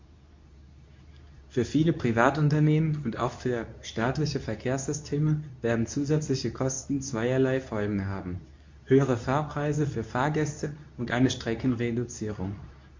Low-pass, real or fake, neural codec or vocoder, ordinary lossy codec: 7.2 kHz; fake; codec, 24 kHz, 0.9 kbps, WavTokenizer, medium speech release version 2; MP3, 48 kbps